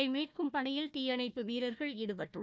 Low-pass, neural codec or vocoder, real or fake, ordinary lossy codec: none; codec, 16 kHz, 1 kbps, FunCodec, trained on Chinese and English, 50 frames a second; fake; none